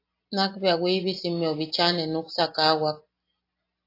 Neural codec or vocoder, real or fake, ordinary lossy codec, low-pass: none; real; AAC, 32 kbps; 5.4 kHz